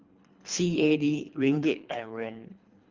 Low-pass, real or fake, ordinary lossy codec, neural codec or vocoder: 7.2 kHz; fake; Opus, 32 kbps; codec, 24 kHz, 3 kbps, HILCodec